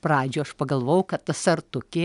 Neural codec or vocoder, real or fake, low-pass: none; real; 10.8 kHz